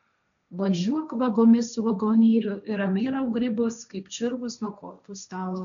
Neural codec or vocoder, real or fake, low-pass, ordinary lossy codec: codec, 16 kHz, 1.1 kbps, Voila-Tokenizer; fake; 7.2 kHz; MP3, 96 kbps